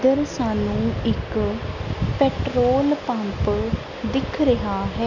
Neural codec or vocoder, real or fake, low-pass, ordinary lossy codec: none; real; 7.2 kHz; none